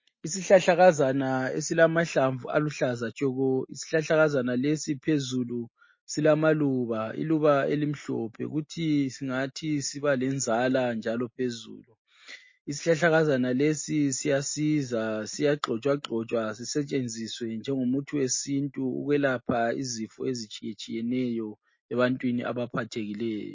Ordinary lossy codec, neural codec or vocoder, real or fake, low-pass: MP3, 32 kbps; none; real; 7.2 kHz